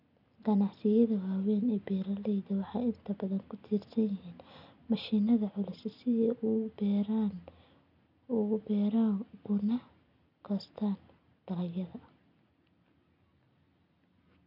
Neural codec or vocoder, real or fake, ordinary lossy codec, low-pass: none; real; none; 5.4 kHz